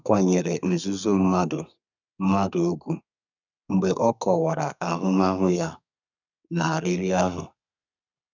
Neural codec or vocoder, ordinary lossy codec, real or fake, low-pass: codec, 32 kHz, 1.9 kbps, SNAC; none; fake; 7.2 kHz